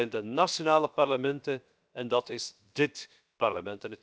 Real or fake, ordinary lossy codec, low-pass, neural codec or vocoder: fake; none; none; codec, 16 kHz, 0.7 kbps, FocalCodec